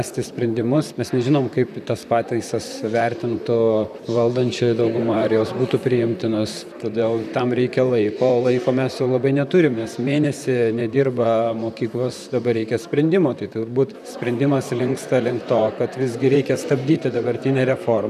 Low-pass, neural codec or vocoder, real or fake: 14.4 kHz; vocoder, 44.1 kHz, 128 mel bands, Pupu-Vocoder; fake